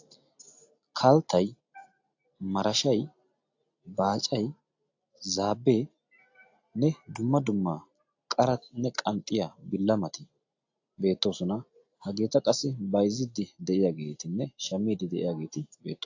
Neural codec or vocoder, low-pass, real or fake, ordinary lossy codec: none; 7.2 kHz; real; AAC, 48 kbps